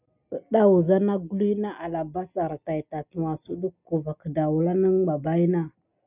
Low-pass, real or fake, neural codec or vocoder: 3.6 kHz; real; none